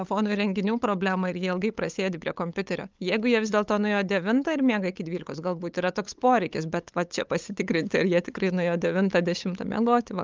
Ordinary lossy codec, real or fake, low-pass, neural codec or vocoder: Opus, 24 kbps; fake; 7.2 kHz; codec, 16 kHz, 8 kbps, FunCodec, trained on LibriTTS, 25 frames a second